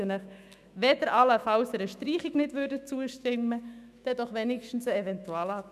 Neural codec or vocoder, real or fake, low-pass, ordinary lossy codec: autoencoder, 48 kHz, 128 numbers a frame, DAC-VAE, trained on Japanese speech; fake; 14.4 kHz; none